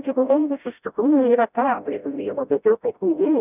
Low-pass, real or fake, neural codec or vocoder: 3.6 kHz; fake; codec, 16 kHz, 0.5 kbps, FreqCodec, smaller model